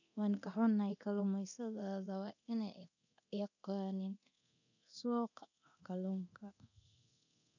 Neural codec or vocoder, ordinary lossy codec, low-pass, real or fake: codec, 24 kHz, 0.9 kbps, DualCodec; none; 7.2 kHz; fake